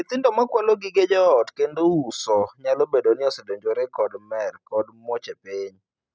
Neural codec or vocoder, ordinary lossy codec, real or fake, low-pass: none; none; real; none